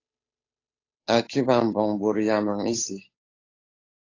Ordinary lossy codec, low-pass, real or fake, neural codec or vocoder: AAC, 32 kbps; 7.2 kHz; fake; codec, 16 kHz, 8 kbps, FunCodec, trained on Chinese and English, 25 frames a second